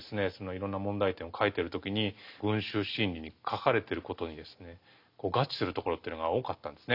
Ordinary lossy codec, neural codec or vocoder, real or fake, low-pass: none; none; real; 5.4 kHz